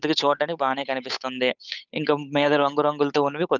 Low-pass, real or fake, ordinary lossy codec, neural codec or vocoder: 7.2 kHz; fake; none; codec, 16 kHz, 8 kbps, FunCodec, trained on Chinese and English, 25 frames a second